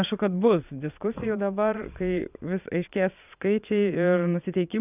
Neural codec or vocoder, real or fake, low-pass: vocoder, 22.05 kHz, 80 mel bands, Vocos; fake; 3.6 kHz